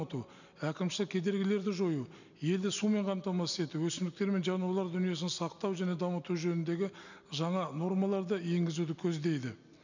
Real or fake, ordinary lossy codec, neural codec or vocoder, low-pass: real; none; none; 7.2 kHz